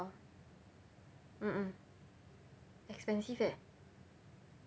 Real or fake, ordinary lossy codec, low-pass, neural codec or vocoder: real; none; none; none